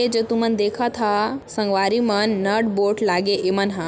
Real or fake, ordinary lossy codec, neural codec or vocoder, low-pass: real; none; none; none